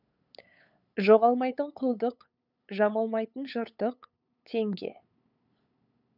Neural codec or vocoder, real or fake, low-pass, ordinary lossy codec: codec, 16 kHz, 8 kbps, FunCodec, trained on LibriTTS, 25 frames a second; fake; 5.4 kHz; none